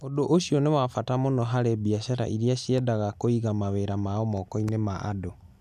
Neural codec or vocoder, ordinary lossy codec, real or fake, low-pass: none; none; real; 14.4 kHz